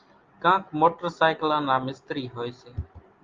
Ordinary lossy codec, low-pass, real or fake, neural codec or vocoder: Opus, 32 kbps; 7.2 kHz; real; none